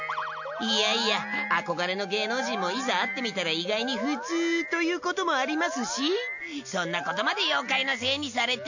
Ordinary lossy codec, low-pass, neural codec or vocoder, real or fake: none; 7.2 kHz; none; real